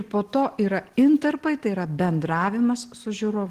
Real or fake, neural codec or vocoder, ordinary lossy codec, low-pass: real; none; Opus, 16 kbps; 14.4 kHz